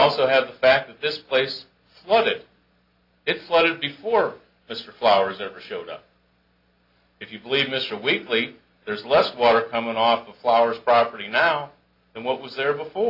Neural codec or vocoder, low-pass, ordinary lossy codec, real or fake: none; 5.4 kHz; AAC, 48 kbps; real